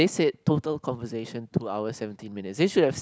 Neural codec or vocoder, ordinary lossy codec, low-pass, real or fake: none; none; none; real